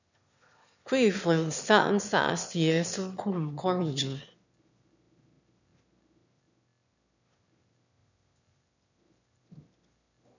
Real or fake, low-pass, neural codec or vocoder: fake; 7.2 kHz; autoencoder, 22.05 kHz, a latent of 192 numbers a frame, VITS, trained on one speaker